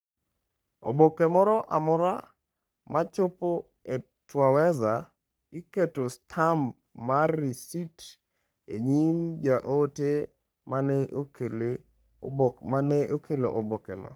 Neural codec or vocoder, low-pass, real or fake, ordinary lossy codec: codec, 44.1 kHz, 3.4 kbps, Pupu-Codec; none; fake; none